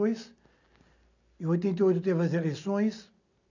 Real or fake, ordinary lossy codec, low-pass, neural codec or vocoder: real; none; 7.2 kHz; none